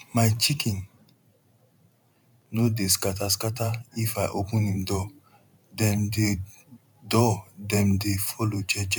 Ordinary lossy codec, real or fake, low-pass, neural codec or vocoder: none; fake; 19.8 kHz; vocoder, 44.1 kHz, 128 mel bands every 512 samples, BigVGAN v2